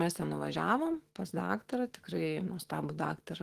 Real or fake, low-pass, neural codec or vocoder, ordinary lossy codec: fake; 14.4 kHz; autoencoder, 48 kHz, 128 numbers a frame, DAC-VAE, trained on Japanese speech; Opus, 16 kbps